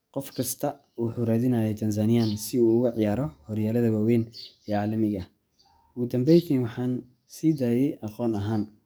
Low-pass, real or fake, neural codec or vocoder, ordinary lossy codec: none; fake; codec, 44.1 kHz, 7.8 kbps, DAC; none